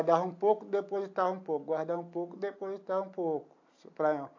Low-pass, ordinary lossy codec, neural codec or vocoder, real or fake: 7.2 kHz; AAC, 48 kbps; none; real